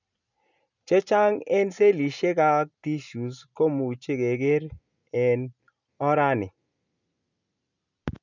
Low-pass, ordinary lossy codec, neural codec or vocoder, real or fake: 7.2 kHz; none; none; real